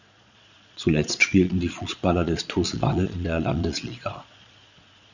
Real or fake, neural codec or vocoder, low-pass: fake; vocoder, 22.05 kHz, 80 mel bands, Vocos; 7.2 kHz